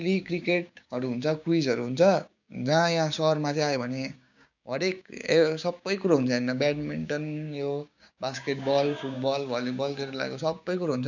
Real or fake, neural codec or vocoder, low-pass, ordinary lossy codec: fake; codec, 16 kHz, 6 kbps, DAC; 7.2 kHz; none